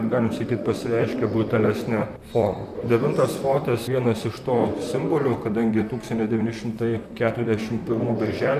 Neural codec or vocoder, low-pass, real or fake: vocoder, 44.1 kHz, 128 mel bands, Pupu-Vocoder; 14.4 kHz; fake